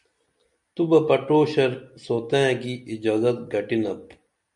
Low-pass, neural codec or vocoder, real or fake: 10.8 kHz; none; real